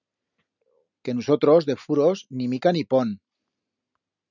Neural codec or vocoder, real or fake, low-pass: none; real; 7.2 kHz